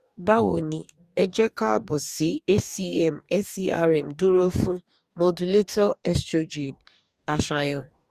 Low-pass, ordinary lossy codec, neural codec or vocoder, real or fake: 14.4 kHz; Opus, 64 kbps; codec, 44.1 kHz, 2.6 kbps, DAC; fake